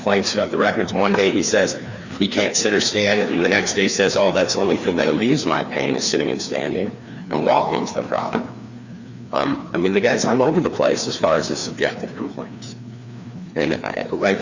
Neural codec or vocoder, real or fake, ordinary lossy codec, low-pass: codec, 16 kHz, 2 kbps, FreqCodec, larger model; fake; Opus, 64 kbps; 7.2 kHz